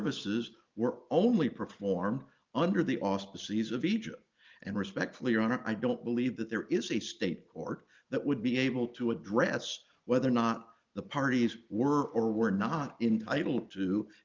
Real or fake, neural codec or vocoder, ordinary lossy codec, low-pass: real; none; Opus, 32 kbps; 7.2 kHz